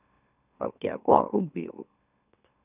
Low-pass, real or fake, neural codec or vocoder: 3.6 kHz; fake; autoencoder, 44.1 kHz, a latent of 192 numbers a frame, MeloTTS